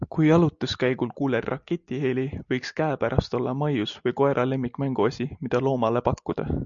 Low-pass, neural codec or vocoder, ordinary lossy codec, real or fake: 7.2 kHz; none; MP3, 96 kbps; real